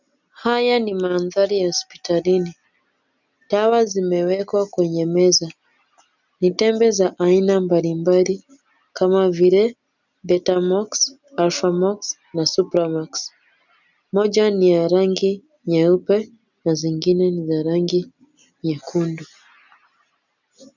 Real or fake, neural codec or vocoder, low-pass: real; none; 7.2 kHz